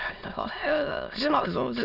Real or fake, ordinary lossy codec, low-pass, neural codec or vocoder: fake; none; 5.4 kHz; autoencoder, 22.05 kHz, a latent of 192 numbers a frame, VITS, trained on many speakers